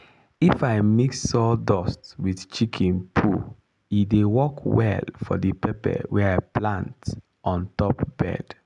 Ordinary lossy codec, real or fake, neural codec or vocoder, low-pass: none; real; none; 10.8 kHz